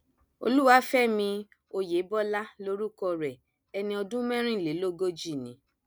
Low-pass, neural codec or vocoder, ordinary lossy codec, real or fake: none; none; none; real